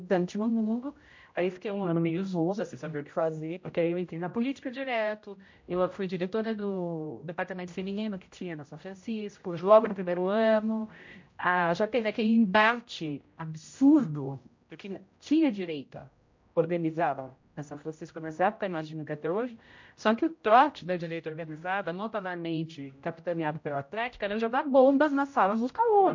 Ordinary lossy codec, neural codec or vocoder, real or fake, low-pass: MP3, 48 kbps; codec, 16 kHz, 0.5 kbps, X-Codec, HuBERT features, trained on general audio; fake; 7.2 kHz